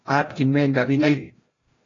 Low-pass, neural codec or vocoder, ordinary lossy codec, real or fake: 7.2 kHz; codec, 16 kHz, 0.5 kbps, FreqCodec, larger model; AAC, 32 kbps; fake